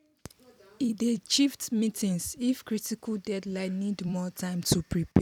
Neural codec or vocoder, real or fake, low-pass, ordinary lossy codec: vocoder, 44.1 kHz, 128 mel bands every 256 samples, BigVGAN v2; fake; 19.8 kHz; none